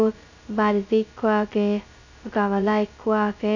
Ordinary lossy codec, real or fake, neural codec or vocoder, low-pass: none; fake; codec, 16 kHz, 0.2 kbps, FocalCodec; 7.2 kHz